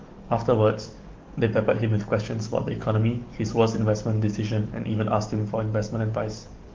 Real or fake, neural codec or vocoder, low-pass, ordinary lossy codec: fake; codec, 44.1 kHz, 7.8 kbps, DAC; 7.2 kHz; Opus, 32 kbps